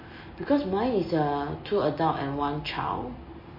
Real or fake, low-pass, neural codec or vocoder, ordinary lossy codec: real; 5.4 kHz; none; MP3, 24 kbps